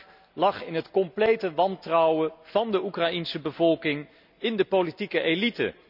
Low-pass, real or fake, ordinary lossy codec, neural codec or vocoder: 5.4 kHz; real; none; none